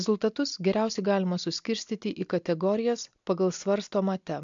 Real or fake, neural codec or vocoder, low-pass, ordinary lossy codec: real; none; 7.2 kHz; MP3, 64 kbps